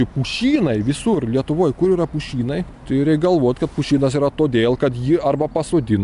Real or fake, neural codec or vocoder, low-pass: real; none; 10.8 kHz